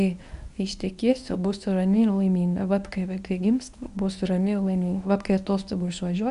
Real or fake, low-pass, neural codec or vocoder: fake; 10.8 kHz; codec, 24 kHz, 0.9 kbps, WavTokenizer, medium speech release version 1